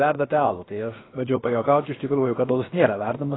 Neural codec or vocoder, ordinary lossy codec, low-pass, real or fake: codec, 16 kHz, about 1 kbps, DyCAST, with the encoder's durations; AAC, 16 kbps; 7.2 kHz; fake